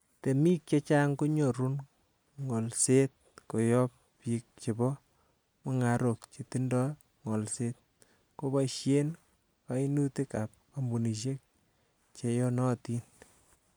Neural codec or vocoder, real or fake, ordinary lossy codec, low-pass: none; real; none; none